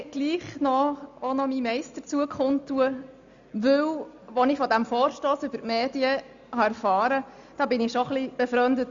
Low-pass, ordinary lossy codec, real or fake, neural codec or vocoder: 7.2 kHz; Opus, 64 kbps; real; none